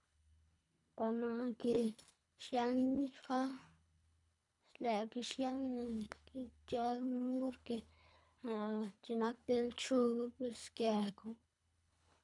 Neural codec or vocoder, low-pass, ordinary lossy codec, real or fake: codec, 24 kHz, 3 kbps, HILCodec; 10.8 kHz; none; fake